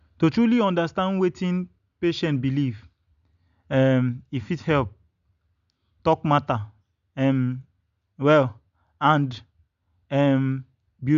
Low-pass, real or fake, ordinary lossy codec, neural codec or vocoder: 7.2 kHz; real; none; none